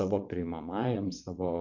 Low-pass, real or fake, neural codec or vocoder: 7.2 kHz; fake; vocoder, 22.05 kHz, 80 mel bands, WaveNeXt